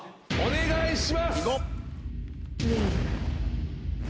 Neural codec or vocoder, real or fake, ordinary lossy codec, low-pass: none; real; none; none